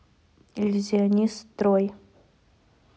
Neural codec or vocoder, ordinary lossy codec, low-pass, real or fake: none; none; none; real